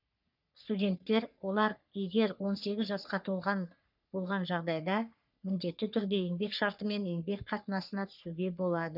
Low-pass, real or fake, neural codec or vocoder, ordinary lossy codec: 5.4 kHz; fake; codec, 44.1 kHz, 3.4 kbps, Pupu-Codec; none